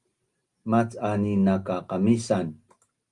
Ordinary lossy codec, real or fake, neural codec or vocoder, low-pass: Opus, 32 kbps; real; none; 10.8 kHz